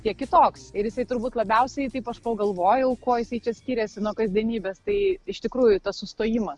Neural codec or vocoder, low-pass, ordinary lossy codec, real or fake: none; 10.8 kHz; Opus, 64 kbps; real